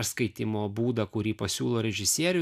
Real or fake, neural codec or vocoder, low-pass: real; none; 14.4 kHz